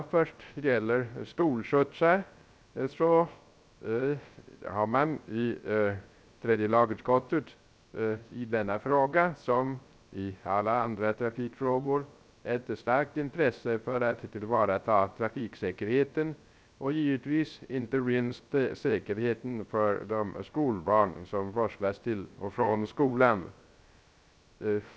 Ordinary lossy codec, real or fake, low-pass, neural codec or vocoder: none; fake; none; codec, 16 kHz, 0.3 kbps, FocalCodec